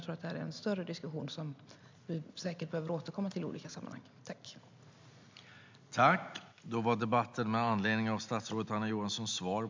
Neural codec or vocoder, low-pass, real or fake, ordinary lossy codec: none; 7.2 kHz; real; MP3, 64 kbps